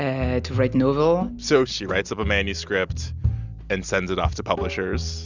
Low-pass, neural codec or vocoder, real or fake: 7.2 kHz; none; real